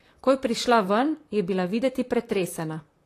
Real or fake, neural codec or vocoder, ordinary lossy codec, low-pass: fake; vocoder, 44.1 kHz, 128 mel bands, Pupu-Vocoder; AAC, 48 kbps; 14.4 kHz